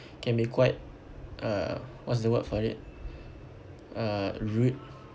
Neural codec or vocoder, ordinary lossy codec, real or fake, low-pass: none; none; real; none